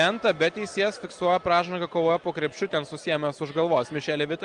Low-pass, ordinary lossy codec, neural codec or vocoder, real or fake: 9.9 kHz; Opus, 24 kbps; none; real